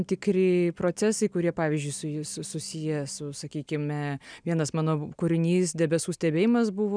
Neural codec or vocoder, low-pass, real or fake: none; 9.9 kHz; real